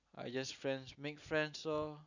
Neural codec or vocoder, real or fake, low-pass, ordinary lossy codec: none; real; 7.2 kHz; none